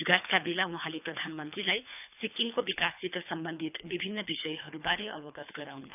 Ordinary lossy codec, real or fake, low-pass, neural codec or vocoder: none; fake; 3.6 kHz; codec, 24 kHz, 3 kbps, HILCodec